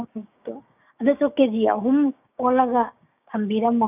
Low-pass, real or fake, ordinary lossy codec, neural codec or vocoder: 3.6 kHz; fake; none; codec, 16 kHz, 6 kbps, DAC